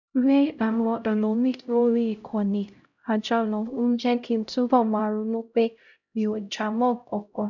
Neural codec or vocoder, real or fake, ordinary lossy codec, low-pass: codec, 16 kHz, 0.5 kbps, X-Codec, HuBERT features, trained on LibriSpeech; fake; none; 7.2 kHz